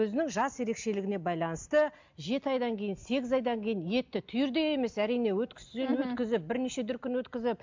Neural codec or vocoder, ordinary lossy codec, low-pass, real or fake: none; MP3, 64 kbps; 7.2 kHz; real